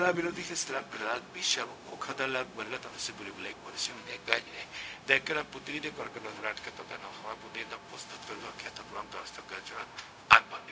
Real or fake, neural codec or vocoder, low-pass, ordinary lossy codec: fake; codec, 16 kHz, 0.4 kbps, LongCat-Audio-Codec; none; none